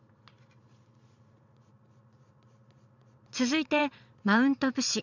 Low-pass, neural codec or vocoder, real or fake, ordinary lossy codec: 7.2 kHz; vocoder, 22.05 kHz, 80 mel bands, Vocos; fake; none